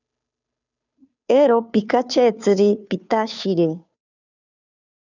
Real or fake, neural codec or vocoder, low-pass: fake; codec, 16 kHz, 2 kbps, FunCodec, trained on Chinese and English, 25 frames a second; 7.2 kHz